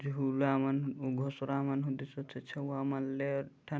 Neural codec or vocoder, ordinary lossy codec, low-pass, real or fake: none; none; none; real